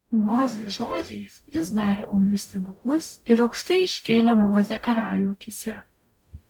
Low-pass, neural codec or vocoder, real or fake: 19.8 kHz; codec, 44.1 kHz, 0.9 kbps, DAC; fake